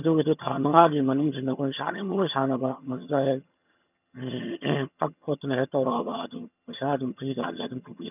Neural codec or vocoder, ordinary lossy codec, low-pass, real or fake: vocoder, 22.05 kHz, 80 mel bands, HiFi-GAN; none; 3.6 kHz; fake